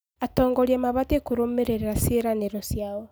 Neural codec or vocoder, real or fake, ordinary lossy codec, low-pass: none; real; none; none